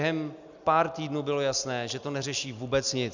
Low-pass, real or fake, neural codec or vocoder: 7.2 kHz; real; none